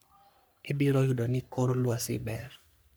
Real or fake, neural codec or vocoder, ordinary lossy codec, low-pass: fake; codec, 44.1 kHz, 3.4 kbps, Pupu-Codec; none; none